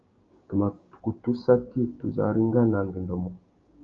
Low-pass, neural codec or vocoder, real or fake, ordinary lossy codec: 7.2 kHz; codec, 16 kHz, 6 kbps, DAC; fake; Opus, 32 kbps